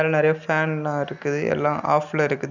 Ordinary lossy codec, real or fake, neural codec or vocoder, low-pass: none; real; none; 7.2 kHz